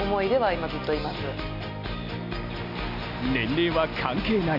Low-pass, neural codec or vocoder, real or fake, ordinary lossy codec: 5.4 kHz; none; real; none